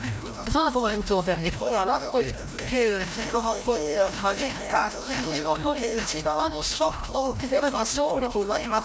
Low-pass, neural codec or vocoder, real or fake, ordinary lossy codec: none; codec, 16 kHz, 0.5 kbps, FreqCodec, larger model; fake; none